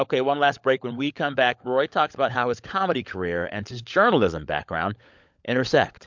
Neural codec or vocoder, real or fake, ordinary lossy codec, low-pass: codec, 16 kHz, 16 kbps, FunCodec, trained on LibriTTS, 50 frames a second; fake; MP3, 64 kbps; 7.2 kHz